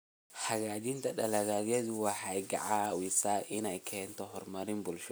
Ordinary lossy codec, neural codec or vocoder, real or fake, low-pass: none; none; real; none